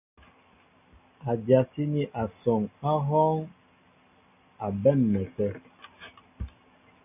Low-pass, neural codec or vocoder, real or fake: 3.6 kHz; none; real